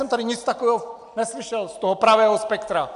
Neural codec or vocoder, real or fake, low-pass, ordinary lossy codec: none; real; 10.8 kHz; AAC, 96 kbps